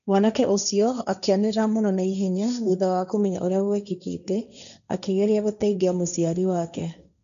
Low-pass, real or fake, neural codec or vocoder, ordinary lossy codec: 7.2 kHz; fake; codec, 16 kHz, 1.1 kbps, Voila-Tokenizer; none